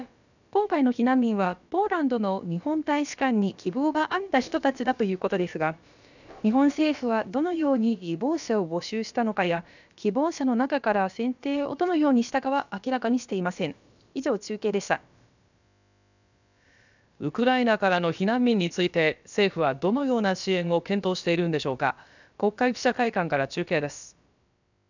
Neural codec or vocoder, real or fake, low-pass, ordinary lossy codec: codec, 16 kHz, about 1 kbps, DyCAST, with the encoder's durations; fake; 7.2 kHz; none